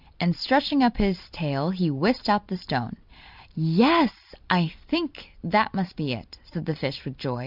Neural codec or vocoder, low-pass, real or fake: none; 5.4 kHz; real